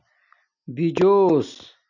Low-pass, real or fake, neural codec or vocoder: 7.2 kHz; real; none